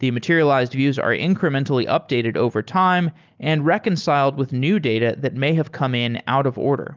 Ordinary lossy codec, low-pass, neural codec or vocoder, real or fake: Opus, 32 kbps; 7.2 kHz; none; real